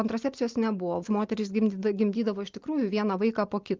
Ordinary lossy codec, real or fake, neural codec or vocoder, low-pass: Opus, 32 kbps; real; none; 7.2 kHz